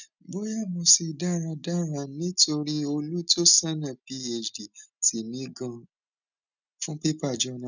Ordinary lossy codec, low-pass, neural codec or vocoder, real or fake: none; 7.2 kHz; none; real